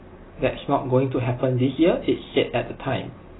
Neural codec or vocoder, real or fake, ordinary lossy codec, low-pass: none; real; AAC, 16 kbps; 7.2 kHz